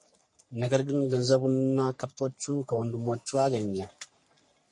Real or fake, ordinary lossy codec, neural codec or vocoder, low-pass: fake; MP3, 48 kbps; codec, 44.1 kHz, 3.4 kbps, Pupu-Codec; 10.8 kHz